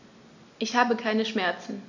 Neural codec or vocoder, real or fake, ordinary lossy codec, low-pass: none; real; none; 7.2 kHz